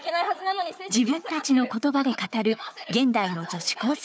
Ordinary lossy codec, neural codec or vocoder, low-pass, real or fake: none; codec, 16 kHz, 4 kbps, FunCodec, trained on Chinese and English, 50 frames a second; none; fake